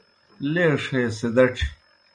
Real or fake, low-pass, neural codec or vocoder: real; 9.9 kHz; none